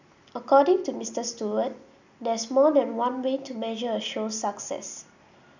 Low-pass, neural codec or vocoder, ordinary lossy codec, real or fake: 7.2 kHz; none; none; real